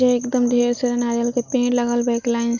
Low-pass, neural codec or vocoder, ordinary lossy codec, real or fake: 7.2 kHz; none; none; real